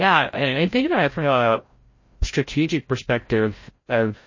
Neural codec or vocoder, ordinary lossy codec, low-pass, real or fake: codec, 16 kHz, 0.5 kbps, FreqCodec, larger model; MP3, 32 kbps; 7.2 kHz; fake